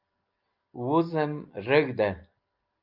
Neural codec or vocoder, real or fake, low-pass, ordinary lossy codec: none; real; 5.4 kHz; Opus, 24 kbps